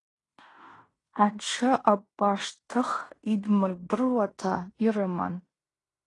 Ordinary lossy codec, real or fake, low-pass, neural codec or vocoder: AAC, 32 kbps; fake; 10.8 kHz; codec, 16 kHz in and 24 kHz out, 0.9 kbps, LongCat-Audio-Codec, fine tuned four codebook decoder